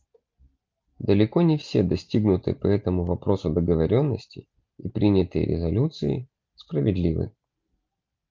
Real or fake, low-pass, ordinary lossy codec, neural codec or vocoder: real; 7.2 kHz; Opus, 32 kbps; none